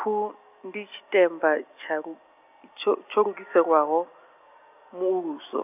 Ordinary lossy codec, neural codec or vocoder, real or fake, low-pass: none; none; real; 3.6 kHz